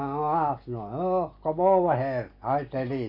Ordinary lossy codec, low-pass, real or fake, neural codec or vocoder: MP3, 32 kbps; 5.4 kHz; real; none